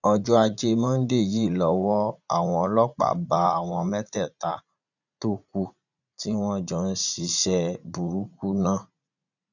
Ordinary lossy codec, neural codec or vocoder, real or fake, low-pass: none; vocoder, 44.1 kHz, 80 mel bands, Vocos; fake; 7.2 kHz